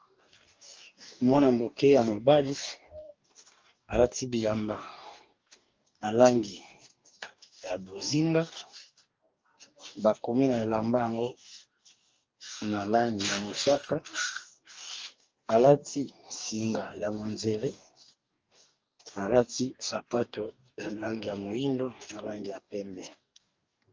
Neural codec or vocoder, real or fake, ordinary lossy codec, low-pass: codec, 44.1 kHz, 2.6 kbps, DAC; fake; Opus, 24 kbps; 7.2 kHz